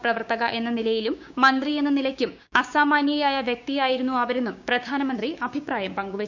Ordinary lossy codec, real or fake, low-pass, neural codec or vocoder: none; fake; 7.2 kHz; codec, 16 kHz, 6 kbps, DAC